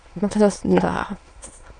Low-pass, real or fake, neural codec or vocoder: 9.9 kHz; fake; autoencoder, 22.05 kHz, a latent of 192 numbers a frame, VITS, trained on many speakers